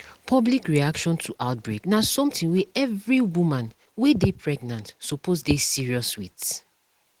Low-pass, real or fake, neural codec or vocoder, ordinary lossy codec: 19.8 kHz; real; none; Opus, 16 kbps